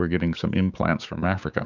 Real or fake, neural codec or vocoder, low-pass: fake; codec, 16 kHz, 6 kbps, DAC; 7.2 kHz